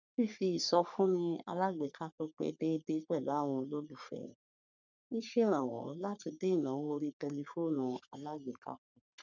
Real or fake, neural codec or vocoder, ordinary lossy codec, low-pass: fake; codec, 44.1 kHz, 3.4 kbps, Pupu-Codec; none; 7.2 kHz